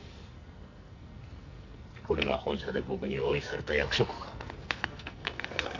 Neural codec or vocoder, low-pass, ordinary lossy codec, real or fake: codec, 44.1 kHz, 2.6 kbps, SNAC; 7.2 kHz; MP3, 64 kbps; fake